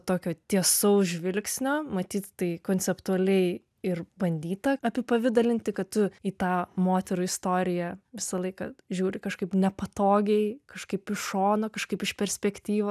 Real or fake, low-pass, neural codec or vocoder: real; 14.4 kHz; none